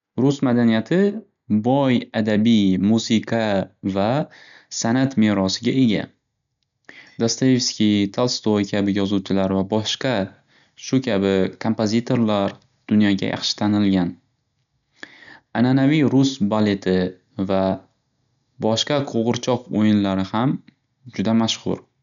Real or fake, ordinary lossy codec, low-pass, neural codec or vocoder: real; none; 7.2 kHz; none